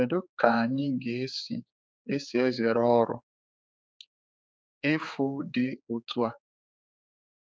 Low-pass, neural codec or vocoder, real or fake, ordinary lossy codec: none; codec, 16 kHz, 4 kbps, X-Codec, HuBERT features, trained on general audio; fake; none